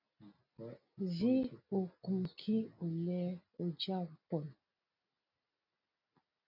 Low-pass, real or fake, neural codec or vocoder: 5.4 kHz; real; none